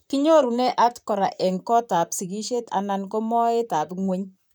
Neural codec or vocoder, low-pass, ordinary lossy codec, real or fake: vocoder, 44.1 kHz, 128 mel bands, Pupu-Vocoder; none; none; fake